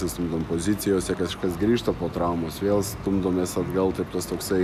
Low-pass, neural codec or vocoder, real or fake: 14.4 kHz; none; real